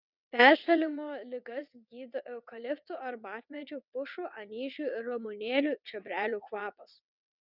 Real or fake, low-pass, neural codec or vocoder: fake; 5.4 kHz; vocoder, 22.05 kHz, 80 mel bands, WaveNeXt